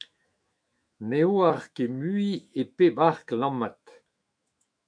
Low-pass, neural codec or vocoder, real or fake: 9.9 kHz; autoencoder, 48 kHz, 128 numbers a frame, DAC-VAE, trained on Japanese speech; fake